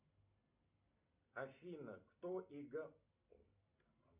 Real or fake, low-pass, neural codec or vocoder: real; 3.6 kHz; none